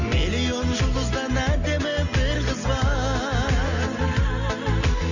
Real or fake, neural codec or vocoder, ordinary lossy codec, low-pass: real; none; none; 7.2 kHz